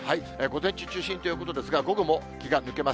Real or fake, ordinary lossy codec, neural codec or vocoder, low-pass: real; none; none; none